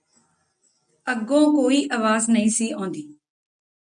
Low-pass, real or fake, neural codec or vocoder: 9.9 kHz; real; none